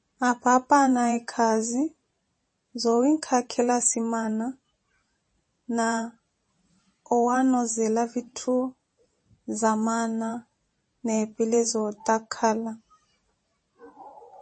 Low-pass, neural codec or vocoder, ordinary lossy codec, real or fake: 9.9 kHz; vocoder, 44.1 kHz, 128 mel bands every 512 samples, BigVGAN v2; MP3, 32 kbps; fake